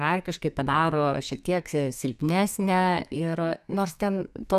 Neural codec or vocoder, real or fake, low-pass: codec, 32 kHz, 1.9 kbps, SNAC; fake; 14.4 kHz